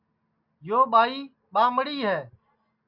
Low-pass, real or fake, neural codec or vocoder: 5.4 kHz; real; none